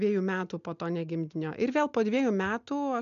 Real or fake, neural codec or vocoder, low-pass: real; none; 7.2 kHz